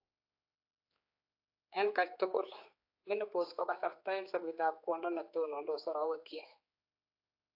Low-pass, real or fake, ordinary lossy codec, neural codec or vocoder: 5.4 kHz; fake; AAC, 32 kbps; codec, 16 kHz, 4 kbps, X-Codec, HuBERT features, trained on general audio